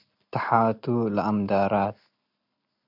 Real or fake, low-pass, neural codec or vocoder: real; 5.4 kHz; none